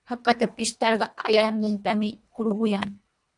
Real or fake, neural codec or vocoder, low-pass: fake; codec, 24 kHz, 1.5 kbps, HILCodec; 10.8 kHz